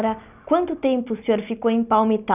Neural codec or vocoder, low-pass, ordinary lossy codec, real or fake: none; 3.6 kHz; none; real